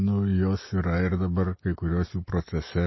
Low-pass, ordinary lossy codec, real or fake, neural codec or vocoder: 7.2 kHz; MP3, 24 kbps; real; none